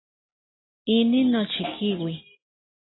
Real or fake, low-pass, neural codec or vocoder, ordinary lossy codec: real; 7.2 kHz; none; AAC, 16 kbps